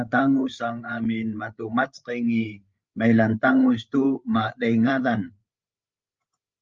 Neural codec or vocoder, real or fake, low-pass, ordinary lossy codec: codec, 16 kHz, 8 kbps, FreqCodec, larger model; fake; 7.2 kHz; Opus, 24 kbps